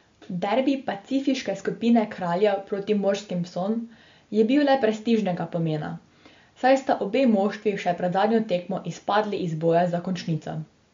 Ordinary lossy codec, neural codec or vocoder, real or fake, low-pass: MP3, 48 kbps; none; real; 7.2 kHz